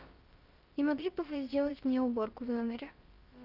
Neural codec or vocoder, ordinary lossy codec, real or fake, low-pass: codec, 16 kHz, about 1 kbps, DyCAST, with the encoder's durations; Opus, 32 kbps; fake; 5.4 kHz